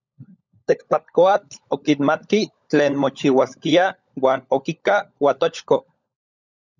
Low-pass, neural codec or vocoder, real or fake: 7.2 kHz; codec, 16 kHz, 16 kbps, FunCodec, trained on LibriTTS, 50 frames a second; fake